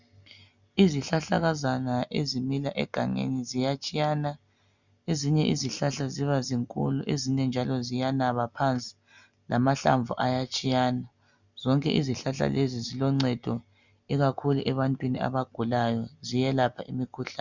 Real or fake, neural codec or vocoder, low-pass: real; none; 7.2 kHz